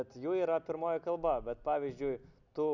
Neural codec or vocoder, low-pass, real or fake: none; 7.2 kHz; real